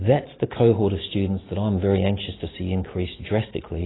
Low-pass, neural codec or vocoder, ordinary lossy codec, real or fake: 7.2 kHz; none; AAC, 16 kbps; real